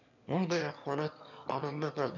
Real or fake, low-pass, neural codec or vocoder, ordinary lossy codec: fake; 7.2 kHz; autoencoder, 22.05 kHz, a latent of 192 numbers a frame, VITS, trained on one speaker; none